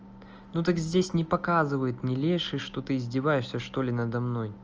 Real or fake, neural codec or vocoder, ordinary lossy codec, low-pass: real; none; Opus, 24 kbps; 7.2 kHz